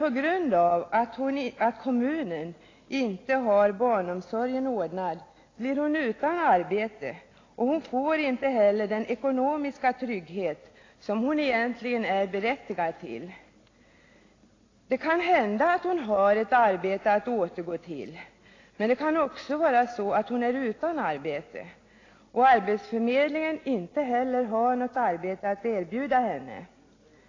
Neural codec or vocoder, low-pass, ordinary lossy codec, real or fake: none; 7.2 kHz; AAC, 32 kbps; real